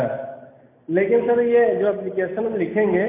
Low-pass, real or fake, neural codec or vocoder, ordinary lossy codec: 3.6 kHz; real; none; MP3, 24 kbps